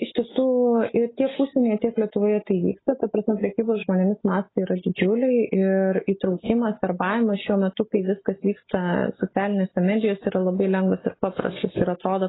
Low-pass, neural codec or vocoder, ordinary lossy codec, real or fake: 7.2 kHz; none; AAC, 16 kbps; real